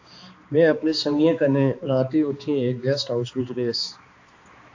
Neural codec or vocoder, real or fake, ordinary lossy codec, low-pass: codec, 16 kHz, 2 kbps, X-Codec, HuBERT features, trained on balanced general audio; fake; AAC, 48 kbps; 7.2 kHz